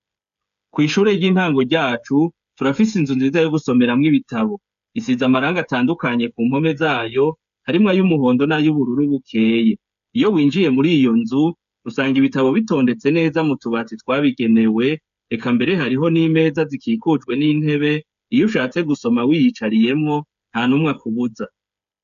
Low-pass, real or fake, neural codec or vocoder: 7.2 kHz; fake; codec, 16 kHz, 8 kbps, FreqCodec, smaller model